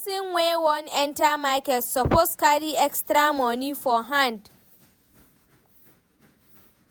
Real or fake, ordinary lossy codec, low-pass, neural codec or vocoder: fake; none; none; vocoder, 48 kHz, 128 mel bands, Vocos